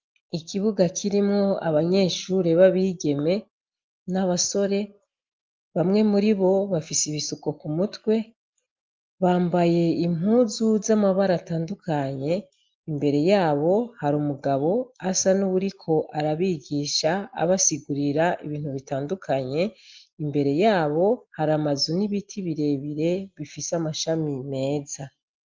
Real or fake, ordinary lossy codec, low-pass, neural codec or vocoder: real; Opus, 24 kbps; 7.2 kHz; none